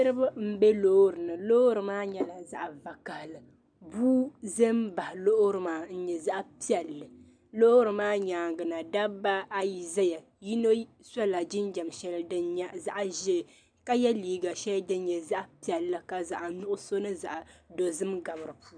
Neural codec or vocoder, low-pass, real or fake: none; 9.9 kHz; real